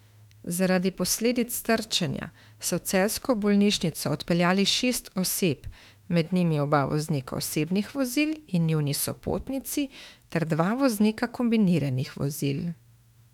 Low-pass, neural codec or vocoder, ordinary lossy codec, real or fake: 19.8 kHz; autoencoder, 48 kHz, 32 numbers a frame, DAC-VAE, trained on Japanese speech; none; fake